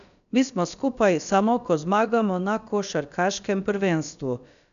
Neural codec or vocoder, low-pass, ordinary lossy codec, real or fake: codec, 16 kHz, about 1 kbps, DyCAST, with the encoder's durations; 7.2 kHz; none; fake